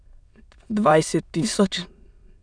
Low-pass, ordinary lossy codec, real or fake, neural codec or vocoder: 9.9 kHz; none; fake; autoencoder, 22.05 kHz, a latent of 192 numbers a frame, VITS, trained on many speakers